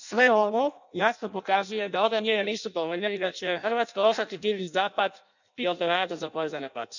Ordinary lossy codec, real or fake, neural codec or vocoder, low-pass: none; fake; codec, 16 kHz in and 24 kHz out, 0.6 kbps, FireRedTTS-2 codec; 7.2 kHz